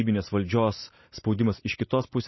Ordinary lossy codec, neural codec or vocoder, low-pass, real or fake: MP3, 24 kbps; none; 7.2 kHz; real